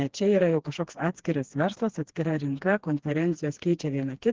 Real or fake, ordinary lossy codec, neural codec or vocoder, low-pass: fake; Opus, 16 kbps; codec, 16 kHz, 2 kbps, FreqCodec, smaller model; 7.2 kHz